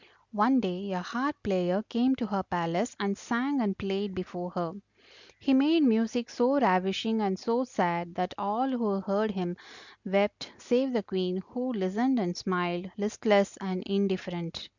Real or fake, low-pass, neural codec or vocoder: real; 7.2 kHz; none